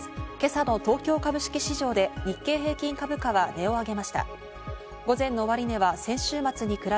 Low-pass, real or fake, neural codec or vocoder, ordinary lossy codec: none; real; none; none